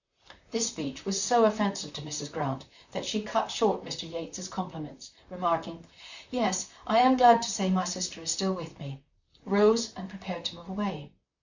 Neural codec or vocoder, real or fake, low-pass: vocoder, 44.1 kHz, 128 mel bands, Pupu-Vocoder; fake; 7.2 kHz